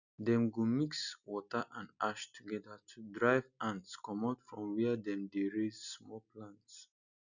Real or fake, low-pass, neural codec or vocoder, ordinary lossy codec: real; 7.2 kHz; none; none